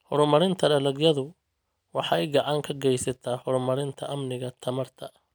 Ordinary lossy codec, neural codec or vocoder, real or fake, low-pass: none; none; real; none